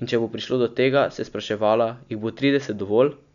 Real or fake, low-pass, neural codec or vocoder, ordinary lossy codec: real; 7.2 kHz; none; none